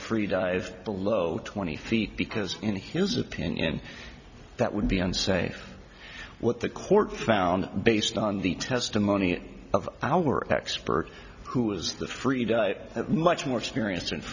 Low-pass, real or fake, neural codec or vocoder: 7.2 kHz; real; none